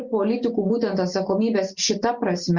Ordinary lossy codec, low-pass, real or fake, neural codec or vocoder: Opus, 64 kbps; 7.2 kHz; real; none